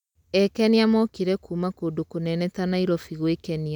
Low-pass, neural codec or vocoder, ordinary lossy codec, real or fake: 19.8 kHz; none; none; real